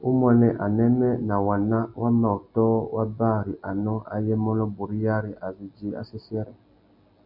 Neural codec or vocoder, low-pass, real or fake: none; 5.4 kHz; real